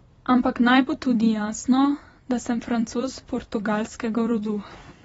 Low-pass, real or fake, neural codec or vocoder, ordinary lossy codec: 19.8 kHz; fake; vocoder, 44.1 kHz, 128 mel bands every 256 samples, BigVGAN v2; AAC, 24 kbps